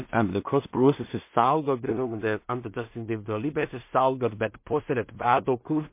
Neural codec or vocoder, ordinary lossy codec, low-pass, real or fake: codec, 16 kHz in and 24 kHz out, 0.4 kbps, LongCat-Audio-Codec, two codebook decoder; MP3, 24 kbps; 3.6 kHz; fake